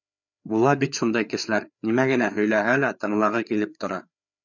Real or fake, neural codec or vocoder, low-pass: fake; codec, 16 kHz, 4 kbps, FreqCodec, larger model; 7.2 kHz